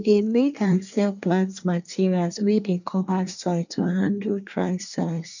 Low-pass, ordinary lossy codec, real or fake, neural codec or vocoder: 7.2 kHz; none; fake; codec, 24 kHz, 1 kbps, SNAC